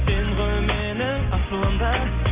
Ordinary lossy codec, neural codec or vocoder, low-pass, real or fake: Opus, 64 kbps; none; 3.6 kHz; real